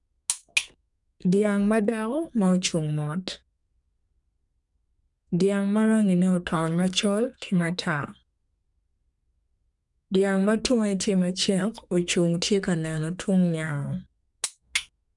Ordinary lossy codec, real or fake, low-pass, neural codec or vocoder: none; fake; 10.8 kHz; codec, 32 kHz, 1.9 kbps, SNAC